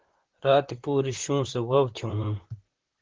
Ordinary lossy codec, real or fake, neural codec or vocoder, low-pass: Opus, 16 kbps; fake; vocoder, 44.1 kHz, 128 mel bands, Pupu-Vocoder; 7.2 kHz